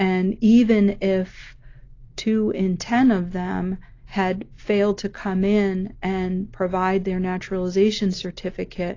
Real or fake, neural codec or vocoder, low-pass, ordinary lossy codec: real; none; 7.2 kHz; AAC, 32 kbps